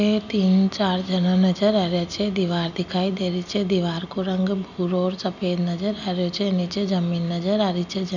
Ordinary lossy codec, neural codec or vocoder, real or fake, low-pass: none; none; real; 7.2 kHz